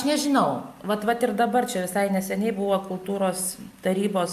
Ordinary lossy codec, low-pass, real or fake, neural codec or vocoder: AAC, 96 kbps; 14.4 kHz; real; none